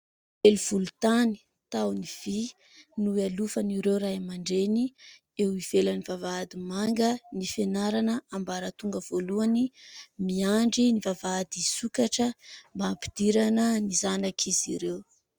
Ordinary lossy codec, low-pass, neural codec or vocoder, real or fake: Opus, 64 kbps; 19.8 kHz; none; real